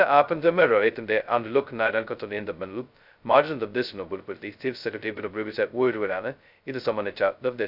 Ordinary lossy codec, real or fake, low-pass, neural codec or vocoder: none; fake; 5.4 kHz; codec, 16 kHz, 0.2 kbps, FocalCodec